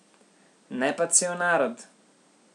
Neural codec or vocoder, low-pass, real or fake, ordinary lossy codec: none; 10.8 kHz; real; none